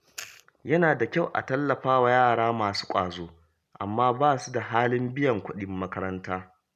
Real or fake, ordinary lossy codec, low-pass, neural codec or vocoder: real; none; 14.4 kHz; none